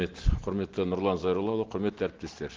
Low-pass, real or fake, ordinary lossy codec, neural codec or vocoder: 7.2 kHz; real; Opus, 16 kbps; none